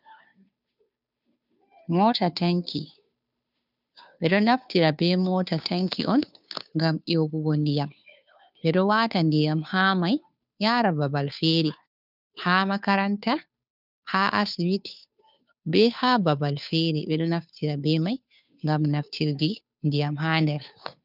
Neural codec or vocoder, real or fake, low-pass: codec, 16 kHz, 2 kbps, FunCodec, trained on Chinese and English, 25 frames a second; fake; 5.4 kHz